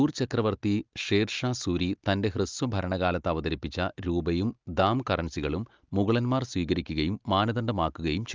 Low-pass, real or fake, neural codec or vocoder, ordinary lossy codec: 7.2 kHz; real; none; Opus, 32 kbps